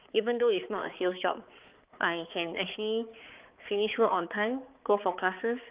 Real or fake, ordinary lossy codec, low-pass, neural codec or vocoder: fake; Opus, 24 kbps; 3.6 kHz; codec, 16 kHz, 4 kbps, X-Codec, HuBERT features, trained on balanced general audio